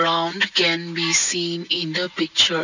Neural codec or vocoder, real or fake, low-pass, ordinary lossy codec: none; real; 7.2 kHz; none